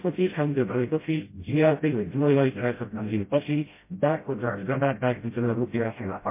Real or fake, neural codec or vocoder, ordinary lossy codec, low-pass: fake; codec, 16 kHz, 0.5 kbps, FreqCodec, smaller model; MP3, 24 kbps; 3.6 kHz